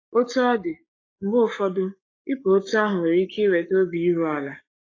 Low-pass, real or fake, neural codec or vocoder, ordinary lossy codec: 7.2 kHz; fake; codec, 44.1 kHz, 7.8 kbps, Pupu-Codec; AAC, 32 kbps